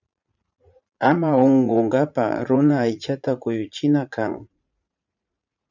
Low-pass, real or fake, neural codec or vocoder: 7.2 kHz; fake; vocoder, 44.1 kHz, 80 mel bands, Vocos